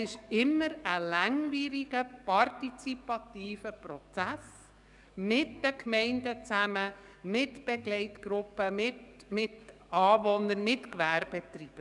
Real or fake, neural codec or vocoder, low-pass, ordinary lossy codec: fake; codec, 44.1 kHz, 7.8 kbps, DAC; 10.8 kHz; none